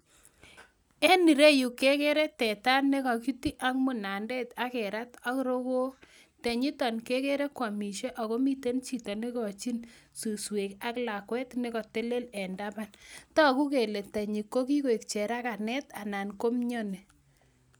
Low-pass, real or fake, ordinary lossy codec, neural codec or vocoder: none; real; none; none